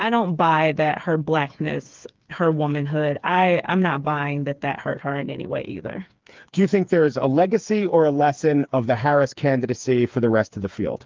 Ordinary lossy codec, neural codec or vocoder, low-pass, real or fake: Opus, 24 kbps; codec, 16 kHz, 4 kbps, FreqCodec, smaller model; 7.2 kHz; fake